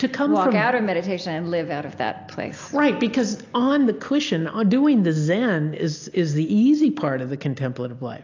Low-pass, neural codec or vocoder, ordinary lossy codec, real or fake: 7.2 kHz; none; AAC, 48 kbps; real